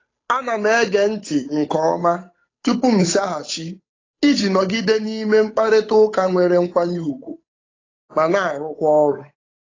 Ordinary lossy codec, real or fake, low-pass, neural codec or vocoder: AAC, 32 kbps; fake; 7.2 kHz; codec, 16 kHz, 8 kbps, FunCodec, trained on Chinese and English, 25 frames a second